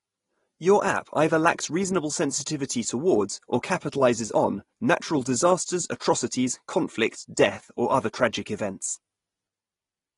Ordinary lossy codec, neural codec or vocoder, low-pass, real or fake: AAC, 32 kbps; none; 10.8 kHz; real